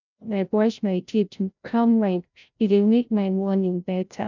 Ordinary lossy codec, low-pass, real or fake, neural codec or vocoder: none; 7.2 kHz; fake; codec, 16 kHz, 0.5 kbps, FreqCodec, larger model